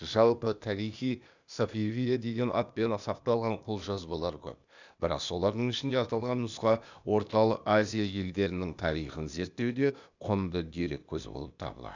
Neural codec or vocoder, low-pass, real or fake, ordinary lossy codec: codec, 16 kHz, 0.8 kbps, ZipCodec; 7.2 kHz; fake; none